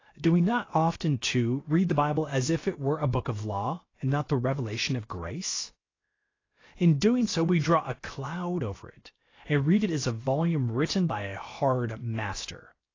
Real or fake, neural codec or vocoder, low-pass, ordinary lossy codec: fake; codec, 16 kHz, 0.7 kbps, FocalCodec; 7.2 kHz; AAC, 32 kbps